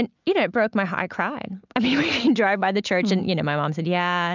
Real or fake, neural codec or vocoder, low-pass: real; none; 7.2 kHz